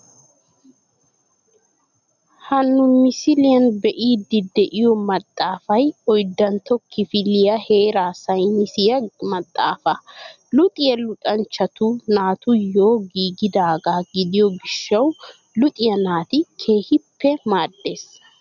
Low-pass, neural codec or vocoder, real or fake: 7.2 kHz; none; real